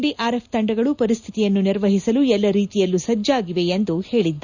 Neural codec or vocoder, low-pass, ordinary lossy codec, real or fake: none; 7.2 kHz; none; real